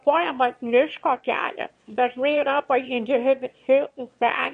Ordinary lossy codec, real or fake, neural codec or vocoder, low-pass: MP3, 48 kbps; fake; autoencoder, 22.05 kHz, a latent of 192 numbers a frame, VITS, trained on one speaker; 9.9 kHz